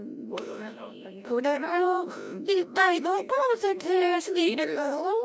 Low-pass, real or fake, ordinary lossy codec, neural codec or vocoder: none; fake; none; codec, 16 kHz, 0.5 kbps, FreqCodec, larger model